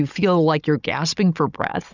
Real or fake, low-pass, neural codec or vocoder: fake; 7.2 kHz; codec, 16 kHz, 16 kbps, FunCodec, trained on Chinese and English, 50 frames a second